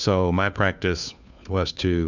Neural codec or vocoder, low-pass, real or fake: codec, 16 kHz, 2 kbps, FunCodec, trained on Chinese and English, 25 frames a second; 7.2 kHz; fake